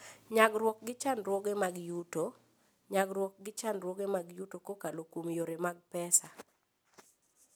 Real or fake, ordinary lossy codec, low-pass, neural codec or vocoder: real; none; none; none